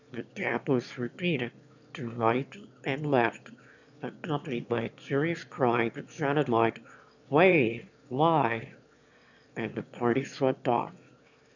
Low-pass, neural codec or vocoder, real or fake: 7.2 kHz; autoencoder, 22.05 kHz, a latent of 192 numbers a frame, VITS, trained on one speaker; fake